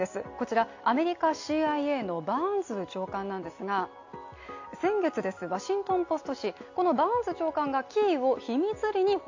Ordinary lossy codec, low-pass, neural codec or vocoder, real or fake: AAC, 48 kbps; 7.2 kHz; none; real